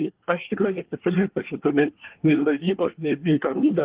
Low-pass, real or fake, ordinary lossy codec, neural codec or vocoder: 3.6 kHz; fake; Opus, 16 kbps; codec, 24 kHz, 1 kbps, SNAC